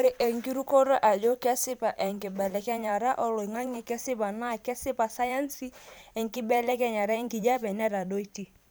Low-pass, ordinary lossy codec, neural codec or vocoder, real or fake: none; none; vocoder, 44.1 kHz, 128 mel bands every 512 samples, BigVGAN v2; fake